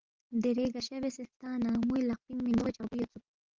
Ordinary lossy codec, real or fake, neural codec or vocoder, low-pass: Opus, 16 kbps; real; none; 7.2 kHz